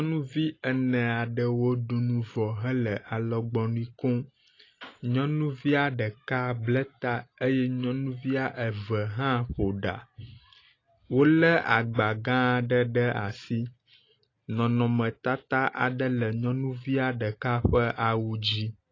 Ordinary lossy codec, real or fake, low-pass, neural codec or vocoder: AAC, 32 kbps; real; 7.2 kHz; none